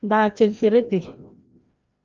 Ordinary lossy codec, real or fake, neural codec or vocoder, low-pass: Opus, 24 kbps; fake; codec, 16 kHz, 1 kbps, FreqCodec, larger model; 7.2 kHz